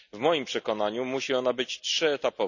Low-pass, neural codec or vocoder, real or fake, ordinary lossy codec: 7.2 kHz; none; real; none